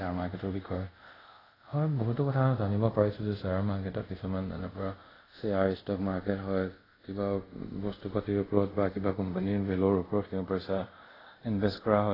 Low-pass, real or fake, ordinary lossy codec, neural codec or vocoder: 5.4 kHz; fake; AAC, 24 kbps; codec, 24 kHz, 0.5 kbps, DualCodec